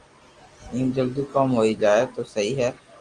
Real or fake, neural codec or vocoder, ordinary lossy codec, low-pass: real; none; Opus, 24 kbps; 9.9 kHz